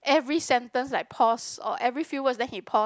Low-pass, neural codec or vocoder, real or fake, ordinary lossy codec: none; none; real; none